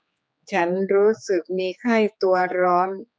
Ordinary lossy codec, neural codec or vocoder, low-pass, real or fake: none; codec, 16 kHz, 4 kbps, X-Codec, HuBERT features, trained on general audio; none; fake